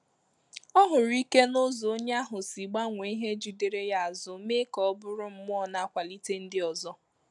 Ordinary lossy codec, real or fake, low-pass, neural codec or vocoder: none; real; 10.8 kHz; none